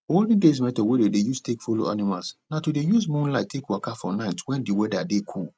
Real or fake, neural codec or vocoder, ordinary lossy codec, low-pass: real; none; none; none